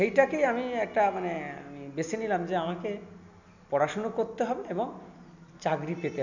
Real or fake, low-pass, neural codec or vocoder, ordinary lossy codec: real; 7.2 kHz; none; none